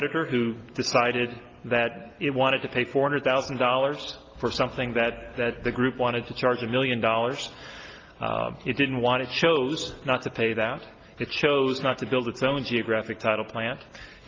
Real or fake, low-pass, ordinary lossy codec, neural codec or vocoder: real; 7.2 kHz; Opus, 32 kbps; none